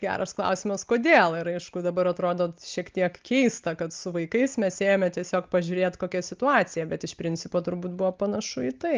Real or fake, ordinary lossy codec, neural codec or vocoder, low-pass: real; Opus, 32 kbps; none; 7.2 kHz